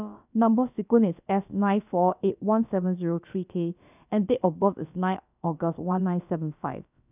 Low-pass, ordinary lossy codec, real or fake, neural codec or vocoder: 3.6 kHz; none; fake; codec, 16 kHz, about 1 kbps, DyCAST, with the encoder's durations